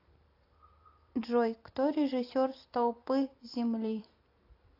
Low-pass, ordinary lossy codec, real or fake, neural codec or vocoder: 5.4 kHz; AAC, 32 kbps; fake; vocoder, 44.1 kHz, 128 mel bands every 256 samples, BigVGAN v2